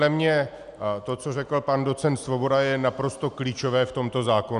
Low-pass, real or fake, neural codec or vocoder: 10.8 kHz; real; none